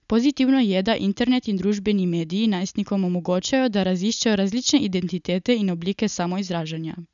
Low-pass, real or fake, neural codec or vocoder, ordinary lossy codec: 7.2 kHz; real; none; none